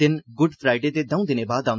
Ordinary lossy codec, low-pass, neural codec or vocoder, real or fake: none; none; none; real